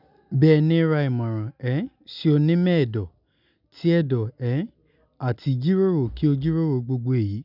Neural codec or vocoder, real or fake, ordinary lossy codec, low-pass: none; real; none; 5.4 kHz